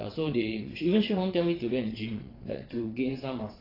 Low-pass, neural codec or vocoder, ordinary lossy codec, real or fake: 5.4 kHz; vocoder, 22.05 kHz, 80 mel bands, WaveNeXt; AAC, 24 kbps; fake